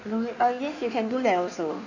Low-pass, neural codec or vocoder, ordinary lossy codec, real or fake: 7.2 kHz; codec, 16 kHz in and 24 kHz out, 1.1 kbps, FireRedTTS-2 codec; none; fake